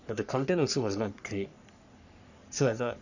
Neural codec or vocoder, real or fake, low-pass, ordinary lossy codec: codec, 44.1 kHz, 3.4 kbps, Pupu-Codec; fake; 7.2 kHz; none